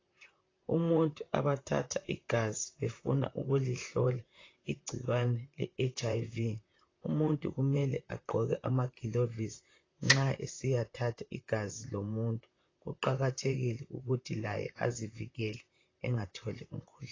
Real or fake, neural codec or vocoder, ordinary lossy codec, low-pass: fake; vocoder, 44.1 kHz, 128 mel bands, Pupu-Vocoder; AAC, 32 kbps; 7.2 kHz